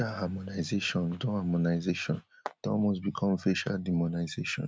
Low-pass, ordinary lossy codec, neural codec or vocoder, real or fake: none; none; none; real